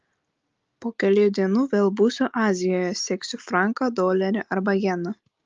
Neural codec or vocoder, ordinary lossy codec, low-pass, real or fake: none; Opus, 32 kbps; 7.2 kHz; real